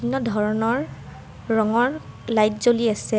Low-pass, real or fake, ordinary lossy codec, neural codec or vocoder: none; real; none; none